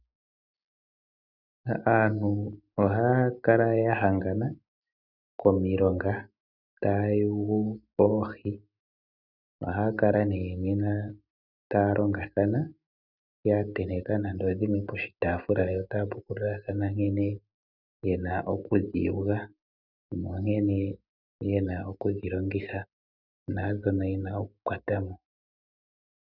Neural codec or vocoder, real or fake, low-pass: none; real; 5.4 kHz